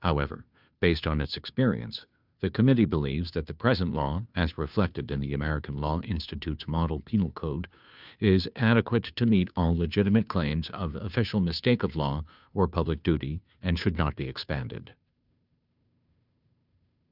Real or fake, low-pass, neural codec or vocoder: fake; 5.4 kHz; codec, 16 kHz, 2 kbps, FunCodec, trained on Chinese and English, 25 frames a second